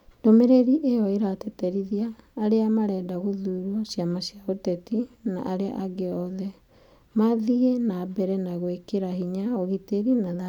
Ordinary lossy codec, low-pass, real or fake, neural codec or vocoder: none; 19.8 kHz; real; none